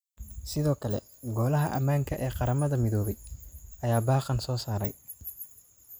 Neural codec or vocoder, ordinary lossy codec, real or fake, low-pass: none; none; real; none